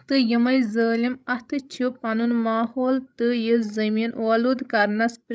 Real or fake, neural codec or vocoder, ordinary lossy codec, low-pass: fake; codec, 16 kHz, 16 kbps, FreqCodec, larger model; none; none